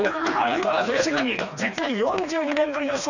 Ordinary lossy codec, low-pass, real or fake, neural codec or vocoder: none; 7.2 kHz; fake; codec, 16 kHz, 2 kbps, FreqCodec, smaller model